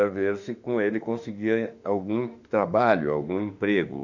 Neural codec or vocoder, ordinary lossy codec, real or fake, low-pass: autoencoder, 48 kHz, 32 numbers a frame, DAC-VAE, trained on Japanese speech; none; fake; 7.2 kHz